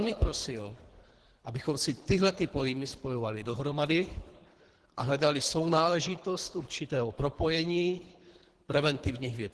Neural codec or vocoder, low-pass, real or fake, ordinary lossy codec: codec, 24 kHz, 3 kbps, HILCodec; 10.8 kHz; fake; Opus, 16 kbps